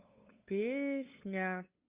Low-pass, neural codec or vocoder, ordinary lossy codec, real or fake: 3.6 kHz; codec, 16 kHz, 8 kbps, FunCodec, trained on LibriTTS, 25 frames a second; Opus, 64 kbps; fake